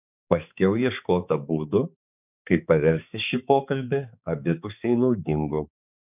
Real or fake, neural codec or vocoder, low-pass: fake; codec, 16 kHz, 4 kbps, X-Codec, HuBERT features, trained on balanced general audio; 3.6 kHz